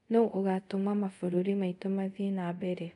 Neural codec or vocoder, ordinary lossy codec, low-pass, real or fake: codec, 24 kHz, 0.5 kbps, DualCodec; none; 10.8 kHz; fake